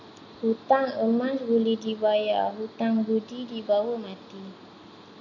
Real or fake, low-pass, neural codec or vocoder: real; 7.2 kHz; none